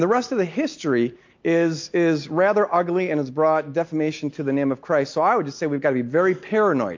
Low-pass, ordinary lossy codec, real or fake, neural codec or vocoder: 7.2 kHz; MP3, 48 kbps; real; none